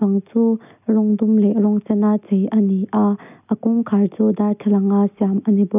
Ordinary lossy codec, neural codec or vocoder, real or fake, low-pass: none; none; real; 3.6 kHz